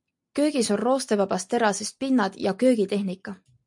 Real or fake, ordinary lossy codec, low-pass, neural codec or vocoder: real; MP3, 64 kbps; 10.8 kHz; none